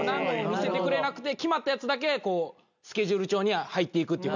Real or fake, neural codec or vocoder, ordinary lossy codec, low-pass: real; none; none; 7.2 kHz